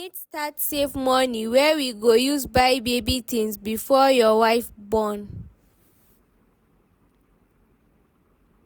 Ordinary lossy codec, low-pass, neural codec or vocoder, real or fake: none; none; none; real